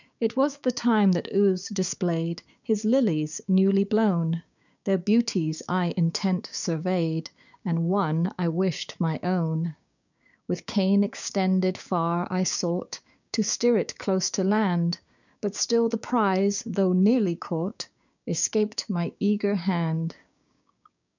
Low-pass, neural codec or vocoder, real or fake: 7.2 kHz; codec, 16 kHz, 6 kbps, DAC; fake